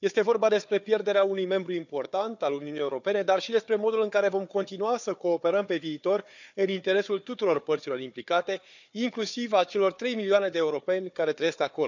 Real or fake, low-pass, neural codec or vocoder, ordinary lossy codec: fake; 7.2 kHz; codec, 16 kHz, 4 kbps, FunCodec, trained on Chinese and English, 50 frames a second; none